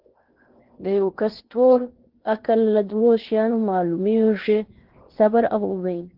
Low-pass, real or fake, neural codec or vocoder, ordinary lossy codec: 5.4 kHz; fake; codec, 16 kHz in and 24 kHz out, 0.8 kbps, FocalCodec, streaming, 65536 codes; Opus, 16 kbps